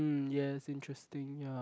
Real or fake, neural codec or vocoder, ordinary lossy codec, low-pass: real; none; none; none